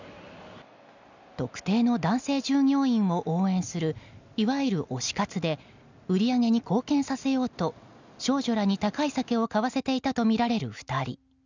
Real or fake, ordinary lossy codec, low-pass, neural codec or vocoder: real; none; 7.2 kHz; none